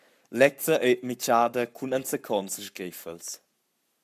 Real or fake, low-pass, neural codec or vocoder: fake; 14.4 kHz; codec, 44.1 kHz, 7.8 kbps, Pupu-Codec